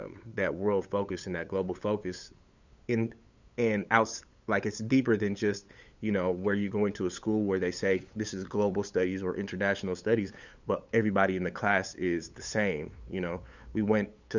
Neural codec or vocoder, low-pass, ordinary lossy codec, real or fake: codec, 16 kHz, 8 kbps, FunCodec, trained on LibriTTS, 25 frames a second; 7.2 kHz; Opus, 64 kbps; fake